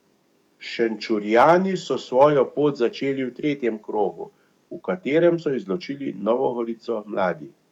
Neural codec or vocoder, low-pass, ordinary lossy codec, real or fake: codec, 44.1 kHz, 7.8 kbps, DAC; 19.8 kHz; none; fake